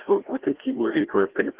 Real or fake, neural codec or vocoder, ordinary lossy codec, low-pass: fake; codec, 16 kHz, 1 kbps, FreqCodec, larger model; Opus, 16 kbps; 3.6 kHz